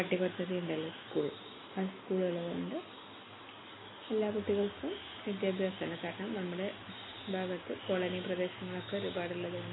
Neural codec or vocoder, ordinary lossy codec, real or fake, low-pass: none; AAC, 16 kbps; real; 7.2 kHz